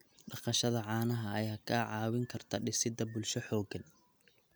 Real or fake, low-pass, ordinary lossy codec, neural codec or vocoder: real; none; none; none